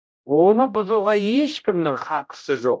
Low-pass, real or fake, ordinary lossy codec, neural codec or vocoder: none; fake; none; codec, 16 kHz, 0.5 kbps, X-Codec, HuBERT features, trained on general audio